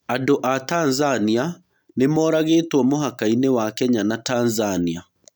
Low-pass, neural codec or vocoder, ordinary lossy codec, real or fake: none; none; none; real